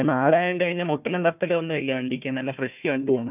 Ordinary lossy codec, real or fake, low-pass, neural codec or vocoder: none; fake; 3.6 kHz; codec, 16 kHz, 1 kbps, FunCodec, trained on Chinese and English, 50 frames a second